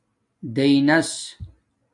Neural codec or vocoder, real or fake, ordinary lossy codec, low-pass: none; real; MP3, 64 kbps; 10.8 kHz